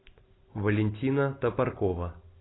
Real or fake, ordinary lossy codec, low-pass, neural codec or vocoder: real; AAC, 16 kbps; 7.2 kHz; none